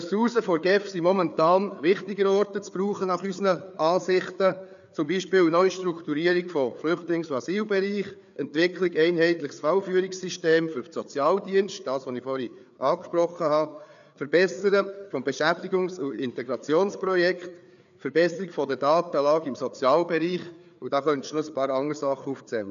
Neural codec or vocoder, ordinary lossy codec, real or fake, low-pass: codec, 16 kHz, 4 kbps, FreqCodec, larger model; none; fake; 7.2 kHz